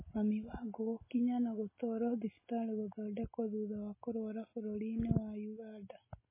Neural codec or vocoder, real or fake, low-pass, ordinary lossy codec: none; real; 3.6 kHz; MP3, 16 kbps